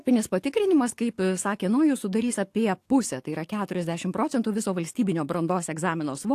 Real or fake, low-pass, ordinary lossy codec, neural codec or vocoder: fake; 14.4 kHz; AAC, 64 kbps; codec, 44.1 kHz, 7.8 kbps, DAC